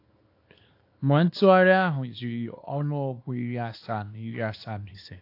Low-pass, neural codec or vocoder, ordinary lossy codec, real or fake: 5.4 kHz; codec, 24 kHz, 0.9 kbps, WavTokenizer, small release; AAC, 32 kbps; fake